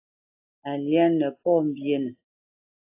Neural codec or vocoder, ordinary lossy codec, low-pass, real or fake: none; AAC, 24 kbps; 3.6 kHz; real